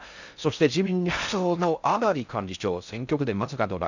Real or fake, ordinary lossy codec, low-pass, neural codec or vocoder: fake; none; 7.2 kHz; codec, 16 kHz in and 24 kHz out, 0.6 kbps, FocalCodec, streaming, 4096 codes